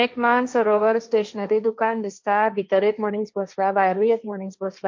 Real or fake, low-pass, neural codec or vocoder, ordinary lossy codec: fake; 7.2 kHz; codec, 16 kHz, 1.1 kbps, Voila-Tokenizer; MP3, 48 kbps